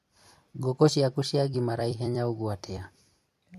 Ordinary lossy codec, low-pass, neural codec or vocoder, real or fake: MP3, 64 kbps; 14.4 kHz; vocoder, 44.1 kHz, 128 mel bands every 256 samples, BigVGAN v2; fake